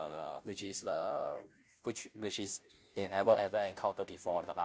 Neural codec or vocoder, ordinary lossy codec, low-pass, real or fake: codec, 16 kHz, 0.5 kbps, FunCodec, trained on Chinese and English, 25 frames a second; none; none; fake